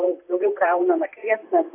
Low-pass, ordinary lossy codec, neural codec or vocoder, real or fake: 3.6 kHz; AAC, 24 kbps; vocoder, 44.1 kHz, 128 mel bands, Pupu-Vocoder; fake